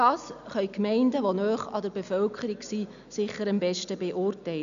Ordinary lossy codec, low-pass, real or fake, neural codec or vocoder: MP3, 96 kbps; 7.2 kHz; real; none